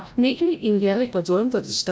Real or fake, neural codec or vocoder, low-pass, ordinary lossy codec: fake; codec, 16 kHz, 0.5 kbps, FreqCodec, larger model; none; none